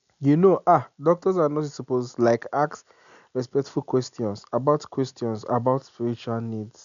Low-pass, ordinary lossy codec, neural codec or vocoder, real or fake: 7.2 kHz; none; none; real